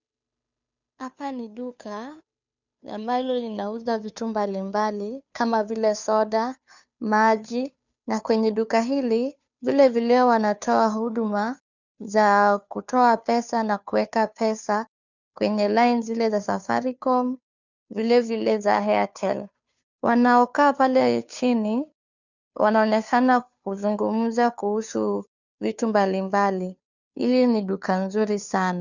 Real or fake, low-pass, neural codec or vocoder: fake; 7.2 kHz; codec, 16 kHz, 2 kbps, FunCodec, trained on Chinese and English, 25 frames a second